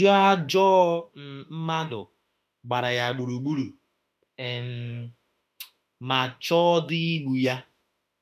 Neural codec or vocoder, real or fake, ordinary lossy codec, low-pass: autoencoder, 48 kHz, 32 numbers a frame, DAC-VAE, trained on Japanese speech; fake; none; 14.4 kHz